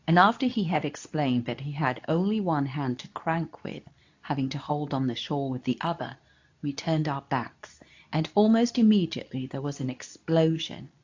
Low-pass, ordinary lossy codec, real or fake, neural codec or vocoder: 7.2 kHz; AAC, 48 kbps; fake; codec, 24 kHz, 0.9 kbps, WavTokenizer, medium speech release version 2